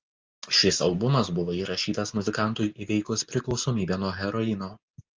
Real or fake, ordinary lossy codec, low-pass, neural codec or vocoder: real; Opus, 24 kbps; 7.2 kHz; none